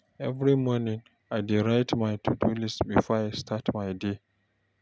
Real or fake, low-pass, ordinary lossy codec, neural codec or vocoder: real; none; none; none